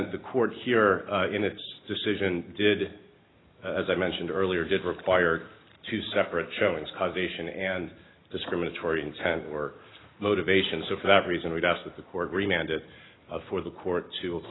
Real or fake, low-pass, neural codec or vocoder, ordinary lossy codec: real; 7.2 kHz; none; AAC, 16 kbps